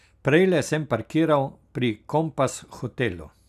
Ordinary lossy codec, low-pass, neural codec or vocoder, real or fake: none; 14.4 kHz; none; real